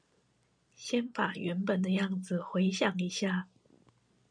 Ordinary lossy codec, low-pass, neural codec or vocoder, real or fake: MP3, 96 kbps; 9.9 kHz; none; real